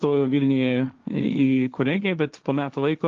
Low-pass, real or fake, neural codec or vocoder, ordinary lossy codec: 7.2 kHz; fake; codec, 16 kHz, 1.1 kbps, Voila-Tokenizer; Opus, 32 kbps